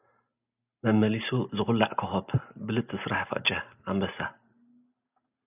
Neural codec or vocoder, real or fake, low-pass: none; real; 3.6 kHz